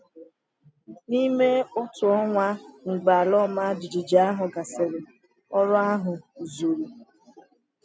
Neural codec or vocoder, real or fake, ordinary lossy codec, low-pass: none; real; none; none